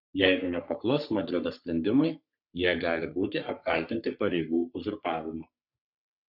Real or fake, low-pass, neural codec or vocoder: fake; 5.4 kHz; codec, 44.1 kHz, 3.4 kbps, Pupu-Codec